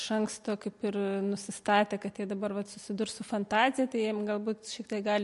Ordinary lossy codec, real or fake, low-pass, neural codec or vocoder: MP3, 48 kbps; real; 14.4 kHz; none